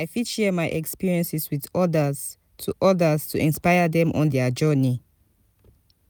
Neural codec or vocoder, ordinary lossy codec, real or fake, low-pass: none; none; real; none